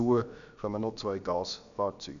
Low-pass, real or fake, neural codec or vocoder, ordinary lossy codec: 7.2 kHz; fake; codec, 16 kHz, about 1 kbps, DyCAST, with the encoder's durations; none